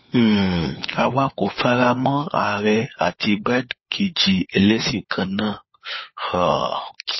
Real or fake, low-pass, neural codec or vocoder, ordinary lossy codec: fake; 7.2 kHz; codec, 16 kHz, 4 kbps, FunCodec, trained on LibriTTS, 50 frames a second; MP3, 24 kbps